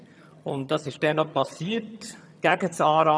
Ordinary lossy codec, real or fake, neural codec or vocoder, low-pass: none; fake; vocoder, 22.05 kHz, 80 mel bands, HiFi-GAN; none